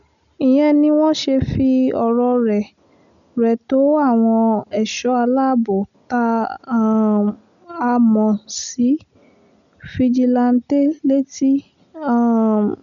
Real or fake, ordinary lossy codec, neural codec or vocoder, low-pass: real; none; none; 7.2 kHz